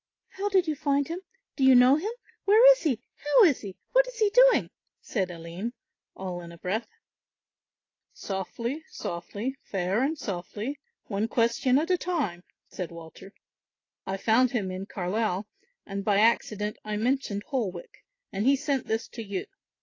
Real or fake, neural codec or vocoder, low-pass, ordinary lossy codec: real; none; 7.2 kHz; AAC, 32 kbps